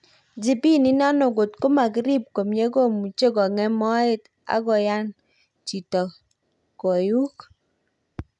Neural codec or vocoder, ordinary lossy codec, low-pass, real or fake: none; none; 10.8 kHz; real